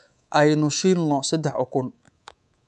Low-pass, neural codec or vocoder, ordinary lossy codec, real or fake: 10.8 kHz; codec, 24 kHz, 3.1 kbps, DualCodec; none; fake